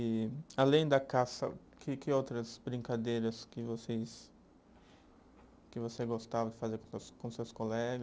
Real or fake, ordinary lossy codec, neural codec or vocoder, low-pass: real; none; none; none